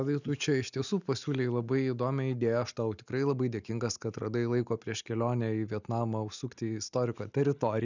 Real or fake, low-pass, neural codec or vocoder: real; 7.2 kHz; none